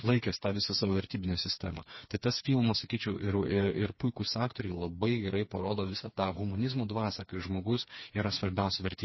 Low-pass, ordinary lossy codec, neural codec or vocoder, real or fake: 7.2 kHz; MP3, 24 kbps; codec, 16 kHz, 4 kbps, FreqCodec, smaller model; fake